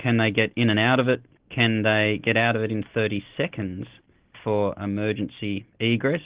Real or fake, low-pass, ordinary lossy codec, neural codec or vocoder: real; 3.6 kHz; Opus, 16 kbps; none